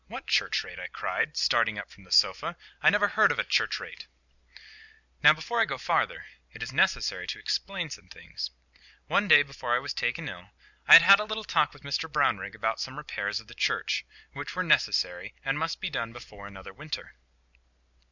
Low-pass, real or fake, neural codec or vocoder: 7.2 kHz; real; none